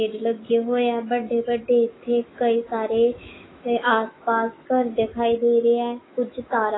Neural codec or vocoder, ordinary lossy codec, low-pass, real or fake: autoencoder, 48 kHz, 128 numbers a frame, DAC-VAE, trained on Japanese speech; AAC, 16 kbps; 7.2 kHz; fake